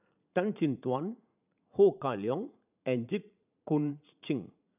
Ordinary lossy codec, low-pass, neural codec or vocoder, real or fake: none; 3.6 kHz; vocoder, 44.1 kHz, 80 mel bands, Vocos; fake